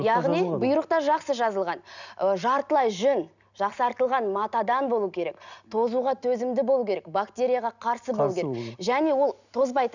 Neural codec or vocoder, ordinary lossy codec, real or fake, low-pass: none; none; real; 7.2 kHz